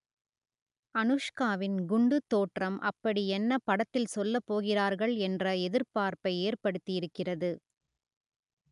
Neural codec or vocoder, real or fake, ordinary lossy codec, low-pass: none; real; none; 10.8 kHz